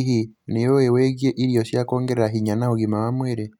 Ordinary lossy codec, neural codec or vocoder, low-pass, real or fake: none; none; 19.8 kHz; real